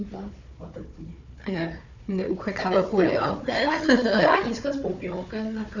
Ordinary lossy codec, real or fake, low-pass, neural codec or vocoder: none; fake; 7.2 kHz; codec, 16 kHz, 4 kbps, FunCodec, trained on Chinese and English, 50 frames a second